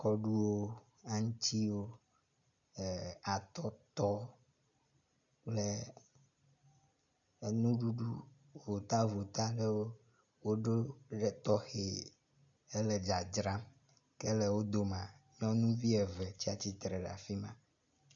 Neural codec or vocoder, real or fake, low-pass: none; real; 7.2 kHz